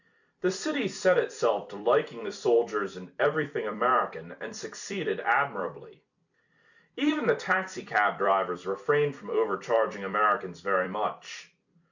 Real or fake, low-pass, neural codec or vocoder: fake; 7.2 kHz; vocoder, 44.1 kHz, 128 mel bands every 256 samples, BigVGAN v2